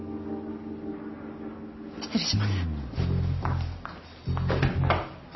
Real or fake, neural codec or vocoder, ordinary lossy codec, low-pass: real; none; MP3, 24 kbps; 7.2 kHz